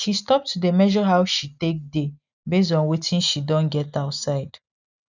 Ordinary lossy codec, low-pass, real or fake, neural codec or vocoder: none; 7.2 kHz; real; none